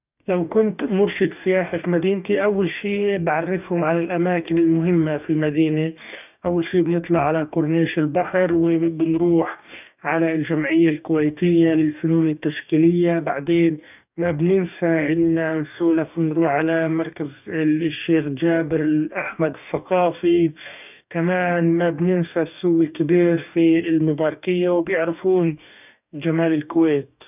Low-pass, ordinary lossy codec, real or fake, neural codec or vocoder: 3.6 kHz; none; fake; codec, 44.1 kHz, 2.6 kbps, DAC